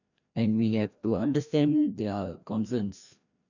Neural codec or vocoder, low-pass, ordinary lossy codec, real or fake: codec, 16 kHz, 1 kbps, FreqCodec, larger model; 7.2 kHz; none; fake